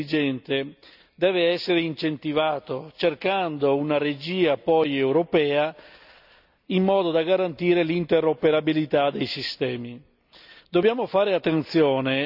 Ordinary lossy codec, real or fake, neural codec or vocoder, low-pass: none; real; none; 5.4 kHz